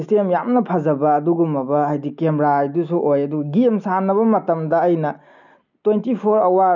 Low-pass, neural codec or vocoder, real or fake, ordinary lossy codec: 7.2 kHz; none; real; none